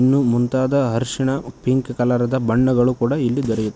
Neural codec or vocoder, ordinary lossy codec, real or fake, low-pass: none; none; real; none